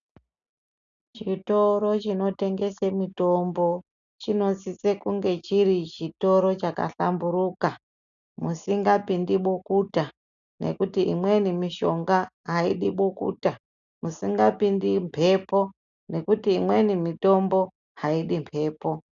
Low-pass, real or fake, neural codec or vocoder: 7.2 kHz; real; none